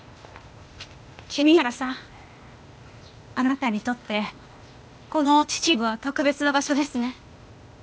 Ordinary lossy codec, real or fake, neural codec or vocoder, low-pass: none; fake; codec, 16 kHz, 0.8 kbps, ZipCodec; none